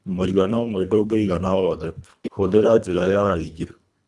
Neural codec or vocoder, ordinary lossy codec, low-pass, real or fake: codec, 24 kHz, 1.5 kbps, HILCodec; none; none; fake